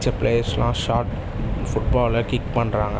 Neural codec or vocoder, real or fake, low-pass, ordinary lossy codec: none; real; none; none